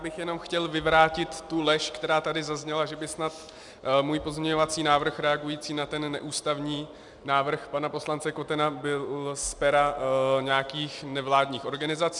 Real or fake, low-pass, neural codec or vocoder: real; 10.8 kHz; none